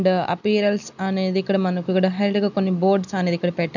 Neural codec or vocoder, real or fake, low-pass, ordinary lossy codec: none; real; 7.2 kHz; none